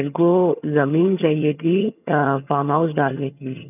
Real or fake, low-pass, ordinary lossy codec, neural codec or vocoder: fake; 3.6 kHz; none; vocoder, 22.05 kHz, 80 mel bands, HiFi-GAN